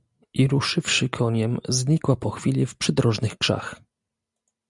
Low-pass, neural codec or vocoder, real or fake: 10.8 kHz; none; real